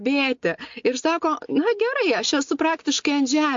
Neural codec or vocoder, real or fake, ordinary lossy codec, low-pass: none; real; MP3, 48 kbps; 7.2 kHz